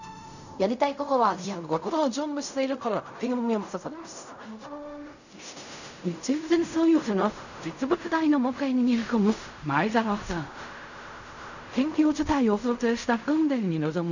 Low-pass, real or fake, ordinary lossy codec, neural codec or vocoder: 7.2 kHz; fake; none; codec, 16 kHz in and 24 kHz out, 0.4 kbps, LongCat-Audio-Codec, fine tuned four codebook decoder